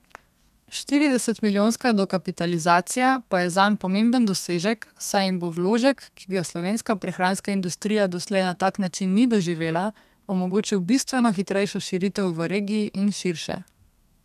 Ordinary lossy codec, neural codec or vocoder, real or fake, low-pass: none; codec, 32 kHz, 1.9 kbps, SNAC; fake; 14.4 kHz